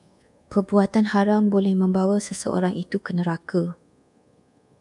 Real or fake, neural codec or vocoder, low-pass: fake; codec, 24 kHz, 1.2 kbps, DualCodec; 10.8 kHz